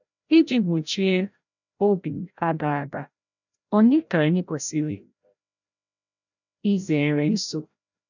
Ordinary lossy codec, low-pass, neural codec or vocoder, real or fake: none; 7.2 kHz; codec, 16 kHz, 0.5 kbps, FreqCodec, larger model; fake